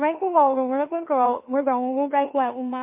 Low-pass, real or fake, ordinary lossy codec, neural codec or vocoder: 3.6 kHz; fake; MP3, 24 kbps; autoencoder, 44.1 kHz, a latent of 192 numbers a frame, MeloTTS